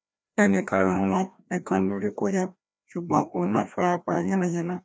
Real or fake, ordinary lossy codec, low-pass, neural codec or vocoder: fake; none; none; codec, 16 kHz, 1 kbps, FreqCodec, larger model